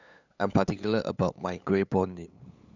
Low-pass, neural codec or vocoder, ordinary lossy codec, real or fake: 7.2 kHz; codec, 16 kHz, 8 kbps, FunCodec, trained on LibriTTS, 25 frames a second; none; fake